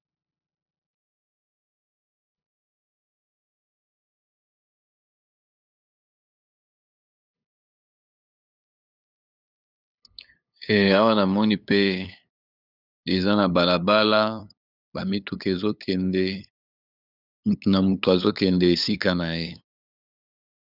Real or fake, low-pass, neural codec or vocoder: fake; 5.4 kHz; codec, 16 kHz, 8 kbps, FunCodec, trained on LibriTTS, 25 frames a second